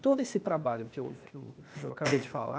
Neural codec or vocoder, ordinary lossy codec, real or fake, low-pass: codec, 16 kHz, 0.8 kbps, ZipCodec; none; fake; none